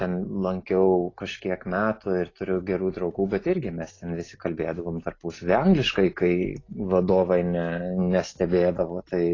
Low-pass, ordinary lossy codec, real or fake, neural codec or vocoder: 7.2 kHz; AAC, 32 kbps; real; none